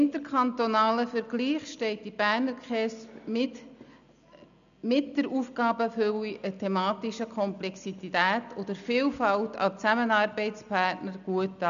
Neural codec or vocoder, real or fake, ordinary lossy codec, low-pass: none; real; none; 7.2 kHz